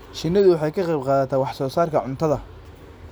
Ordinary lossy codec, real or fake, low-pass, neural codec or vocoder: none; real; none; none